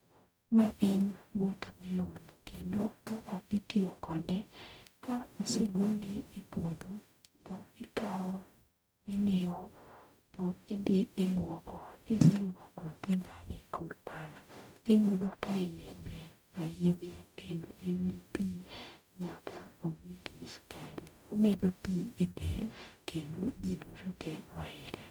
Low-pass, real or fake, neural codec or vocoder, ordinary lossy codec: none; fake; codec, 44.1 kHz, 0.9 kbps, DAC; none